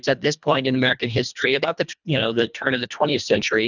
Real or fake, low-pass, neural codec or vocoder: fake; 7.2 kHz; codec, 24 kHz, 1.5 kbps, HILCodec